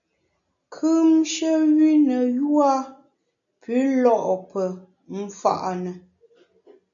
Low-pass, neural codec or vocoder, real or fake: 7.2 kHz; none; real